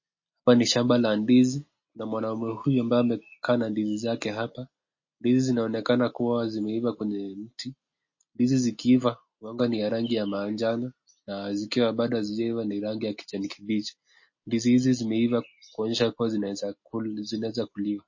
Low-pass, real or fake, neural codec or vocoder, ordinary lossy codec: 7.2 kHz; real; none; MP3, 32 kbps